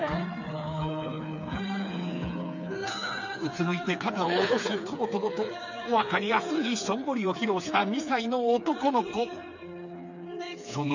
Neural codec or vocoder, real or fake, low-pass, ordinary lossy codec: codec, 16 kHz, 4 kbps, FreqCodec, smaller model; fake; 7.2 kHz; none